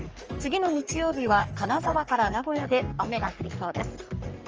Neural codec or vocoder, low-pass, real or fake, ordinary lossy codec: codec, 44.1 kHz, 3.4 kbps, Pupu-Codec; 7.2 kHz; fake; Opus, 24 kbps